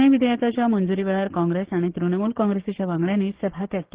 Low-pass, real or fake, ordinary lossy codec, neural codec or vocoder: 3.6 kHz; fake; Opus, 16 kbps; codec, 16 kHz, 6 kbps, DAC